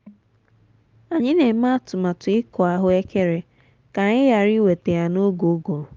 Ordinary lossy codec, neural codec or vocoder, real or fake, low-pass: Opus, 32 kbps; none; real; 7.2 kHz